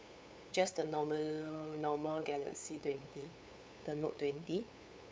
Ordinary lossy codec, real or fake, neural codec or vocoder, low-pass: none; fake; codec, 16 kHz, 8 kbps, FunCodec, trained on LibriTTS, 25 frames a second; none